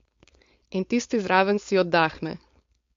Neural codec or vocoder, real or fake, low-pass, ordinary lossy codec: codec, 16 kHz, 4.8 kbps, FACodec; fake; 7.2 kHz; MP3, 48 kbps